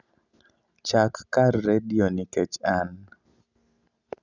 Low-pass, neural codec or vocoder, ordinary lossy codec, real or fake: 7.2 kHz; none; none; real